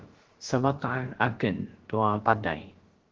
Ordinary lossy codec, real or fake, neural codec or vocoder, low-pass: Opus, 16 kbps; fake; codec, 16 kHz, about 1 kbps, DyCAST, with the encoder's durations; 7.2 kHz